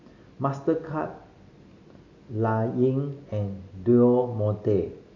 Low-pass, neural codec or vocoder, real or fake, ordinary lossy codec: 7.2 kHz; none; real; AAC, 48 kbps